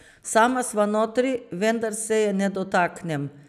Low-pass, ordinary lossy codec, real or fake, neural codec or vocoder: 14.4 kHz; none; real; none